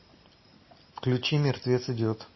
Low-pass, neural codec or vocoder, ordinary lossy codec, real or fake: 7.2 kHz; none; MP3, 24 kbps; real